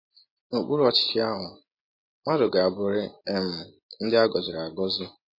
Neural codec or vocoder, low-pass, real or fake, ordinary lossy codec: none; 5.4 kHz; real; MP3, 24 kbps